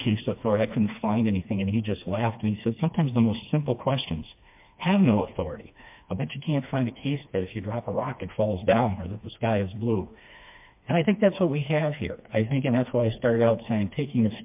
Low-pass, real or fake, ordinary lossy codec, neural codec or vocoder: 3.6 kHz; fake; MP3, 32 kbps; codec, 16 kHz, 2 kbps, FreqCodec, smaller model